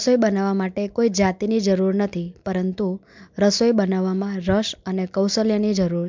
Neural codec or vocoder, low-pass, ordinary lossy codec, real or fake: none; 7.2 kHz; MP3, 64 kbps; real